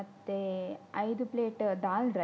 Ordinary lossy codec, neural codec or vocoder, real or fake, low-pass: none; none; real; none